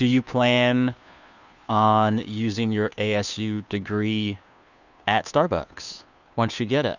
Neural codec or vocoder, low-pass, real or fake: codec, 16 kHz, 2 kbps, FunCodec, trained on Chinese and English, 25 frames a second; 7.2 kHz; fake